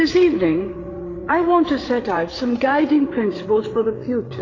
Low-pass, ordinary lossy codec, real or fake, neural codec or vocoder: 7.2 kHz; AAC, 32 kbps; fake; codec, 16 kHz, 8 kbps, FreqCodec, larger model